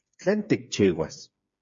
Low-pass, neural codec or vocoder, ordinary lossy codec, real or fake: 7.2 kHz; codec, 16 kHz, 4 kbps, FreqCodec, smaller model; MP3, 48 kbps; fake